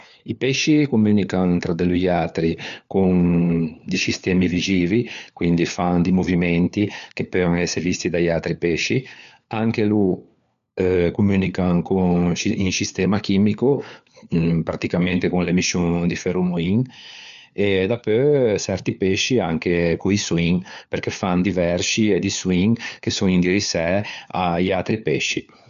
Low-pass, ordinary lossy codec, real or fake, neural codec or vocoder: 7.2 kHz; none; fake; codec, 16 kHz, 4 kbps, FunCodec, trained on LibriTTS, 50 frames a second